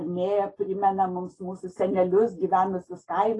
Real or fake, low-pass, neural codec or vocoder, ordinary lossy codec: real; 10.8 kHz; none; AAC, 32 kbps